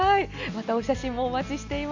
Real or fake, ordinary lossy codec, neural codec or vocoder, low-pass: real; AAC, 48 kbps; none; 7.2 kHz